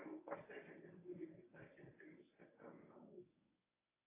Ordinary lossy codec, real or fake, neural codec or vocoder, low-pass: AAC, 16 kbps; fake; codec, 24 kHz, 0.9 kbps, WavTokenizer, medium speech release version 1; 3.6 kHz